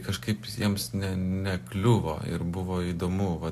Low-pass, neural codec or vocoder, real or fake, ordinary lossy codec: 14.4 kHz; none; real; AAC, 64 kbps